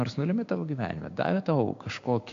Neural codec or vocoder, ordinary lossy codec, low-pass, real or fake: codec, 16 kHz, 6 kbps, DAC; AAC, 48 kbps; 7.2 kHz; fake